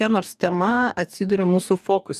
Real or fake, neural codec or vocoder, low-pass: fake; codec, 44.1 kHz, 2.6 kbps, DAC; 14.4 kHz